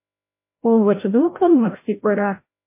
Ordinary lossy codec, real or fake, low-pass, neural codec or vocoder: MP3, 24 kbps; fake; 3.6 kHz; codec, 16 kHz, 0.5 kbps, FreqCodec, larger model